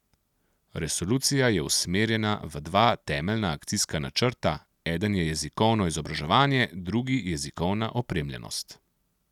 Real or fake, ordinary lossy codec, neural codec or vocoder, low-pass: real; none; none; 19.8 kHz